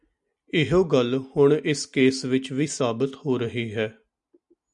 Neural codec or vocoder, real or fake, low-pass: none; real; 10.8 kHz